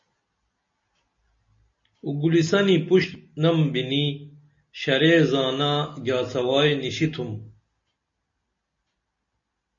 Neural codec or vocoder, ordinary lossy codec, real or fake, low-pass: none; MP3, 32 kbps; real; 7.2 kHz